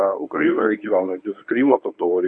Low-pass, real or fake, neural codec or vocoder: 9.9 kHz; fake; codec, 24 kHz, 0.9 kbps, WavTokenizer, medium speech release version 1